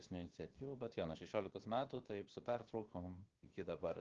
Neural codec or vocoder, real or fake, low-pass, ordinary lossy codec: codec, 16 kHz, 0.7 kbps, FocalCodec; fake; 7.2 kHz; Opus, 16 kbps